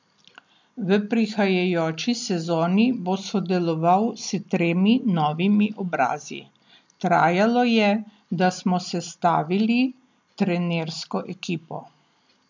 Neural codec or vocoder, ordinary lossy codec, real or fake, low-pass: none; MP3, 64 kbps; real; 7.2 kHz